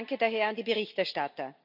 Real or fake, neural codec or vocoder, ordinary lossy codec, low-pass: real; none; none; 5.4 kHz